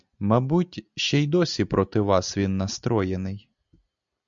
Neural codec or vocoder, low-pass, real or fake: none; 7.2 kHz; real